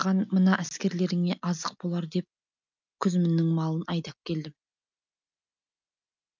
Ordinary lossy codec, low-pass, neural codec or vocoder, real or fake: none; 7.2 kHz; none; real